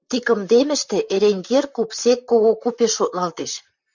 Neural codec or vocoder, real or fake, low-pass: vocoder, 44.1 kHz, 128 mel bands, Pupu-Vocoder; fake; 7.2 kHz